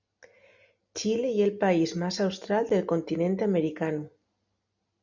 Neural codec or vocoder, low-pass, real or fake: none; 7.2 kHz; real